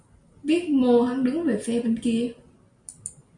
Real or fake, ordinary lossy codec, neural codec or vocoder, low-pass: real; Opus, 64 kbps; none; 10.8 kHz